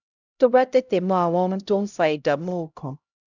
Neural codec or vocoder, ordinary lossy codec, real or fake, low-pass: codec, 16 kHz, 0.5 kbps, X-Codec, HuBERT features, trained on LibriSpeech; none; fake; 7.2 kHz